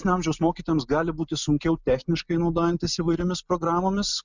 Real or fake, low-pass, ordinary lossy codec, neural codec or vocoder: real; 7.2 kHz; Opus, 64 kbps; none